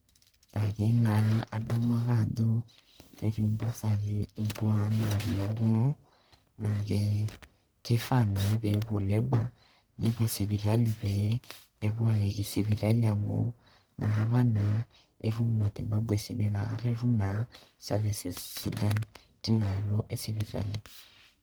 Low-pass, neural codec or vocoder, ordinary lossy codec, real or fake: none; codec, 44.1 kHz, 1.7 kbps, Pupu-Codec; none; fake